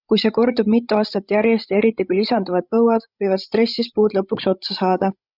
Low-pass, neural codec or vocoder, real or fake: 5.4 kHz; codec, 16 kHz, 16 kbps, FreqCodec, larger model; fake